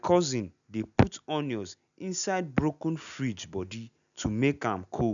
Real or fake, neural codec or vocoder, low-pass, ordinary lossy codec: real; none; 7.2 kHz; none